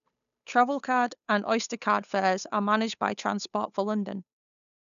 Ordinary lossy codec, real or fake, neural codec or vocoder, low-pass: none; fake; codec, 16 kHz, 8 kbps, FunCodec, trained on Chinese and English, 25 frames a second; 7.2 kHz